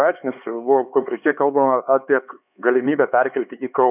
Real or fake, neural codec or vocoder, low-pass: fake; codec, 16 kHz, 4 kbps, X-Codec, HuBERT features, trained on LibriSpeech; 3.6 kHz